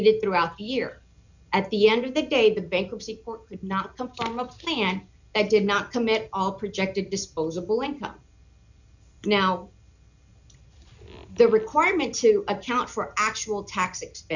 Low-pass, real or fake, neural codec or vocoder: 7.2 kHz; real; none